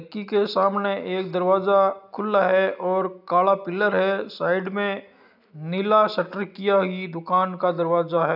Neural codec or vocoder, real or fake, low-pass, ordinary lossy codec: none; real; 5.4 kHz; none